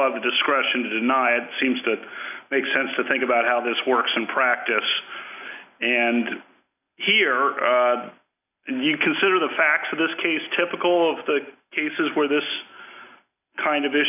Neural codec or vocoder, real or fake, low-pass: none; real; 3.6 kHz